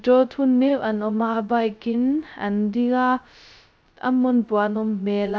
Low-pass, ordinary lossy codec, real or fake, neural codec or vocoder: none; none; fake; codec, 16 kHz, 0.2 kbps, FocalCodec